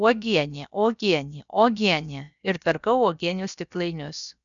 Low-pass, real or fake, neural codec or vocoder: 7.2 kHz; fake; codec, 16 kHz, about 1 kbps, DyCAST, with the encoder's durations